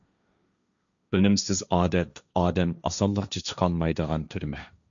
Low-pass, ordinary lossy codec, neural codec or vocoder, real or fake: 7.2 kHz; MP3, 96 kbps; codec, 16 kHz, 1.1 kbps, Voila-Tokenizer; fake